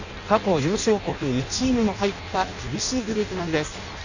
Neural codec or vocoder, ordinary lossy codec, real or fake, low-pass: codec, 16 kHz in and 24 kHz out, 0.6 kbps, FireRedTTS-2 codec; none; fake; 7.2 kHz